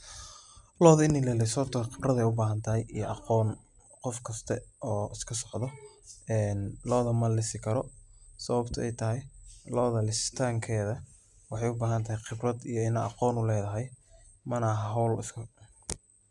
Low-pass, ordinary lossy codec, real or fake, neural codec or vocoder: 10.8 kHz; none; real; none